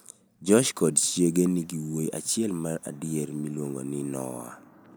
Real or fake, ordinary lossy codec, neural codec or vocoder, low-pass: real; none; none; none